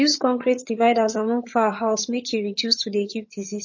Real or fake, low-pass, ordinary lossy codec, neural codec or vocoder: fake; 7.2 kHz; MP3, 32 kbps; vocoder, 22.05 kHz, 80 mel bands, HiFi-GAN